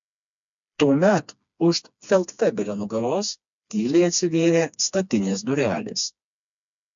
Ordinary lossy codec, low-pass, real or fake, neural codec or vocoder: MP3, 64 kbps; 7.2 kHz; fake; codec, 16 kHz, 2 kbps, FreqCodec, smaller model